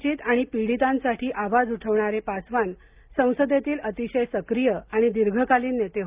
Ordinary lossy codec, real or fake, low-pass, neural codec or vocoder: Opus, 24 kbps; real; 3.6 kHz; none